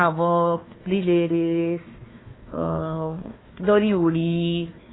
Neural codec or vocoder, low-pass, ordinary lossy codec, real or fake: codec, 16 kHz, 1 kbps, FunCodec, trained on Chinese and English, 50 frames a second; 7.2 kHz; AAC, 16 kbps; fake